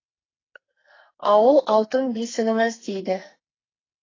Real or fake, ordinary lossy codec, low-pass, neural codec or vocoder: fake; AAC, 32 kbps; 7.2 kHz; codec, 44.1 kHz, 2.6 kbps, SNAC